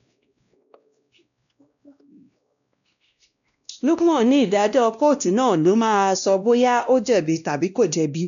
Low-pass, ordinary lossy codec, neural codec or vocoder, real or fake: 7.2 kHz; none; codec, 16 kHz, 1 kbps, X-Codec, WavLM features, trained on Multilingual LibriSpeech; fake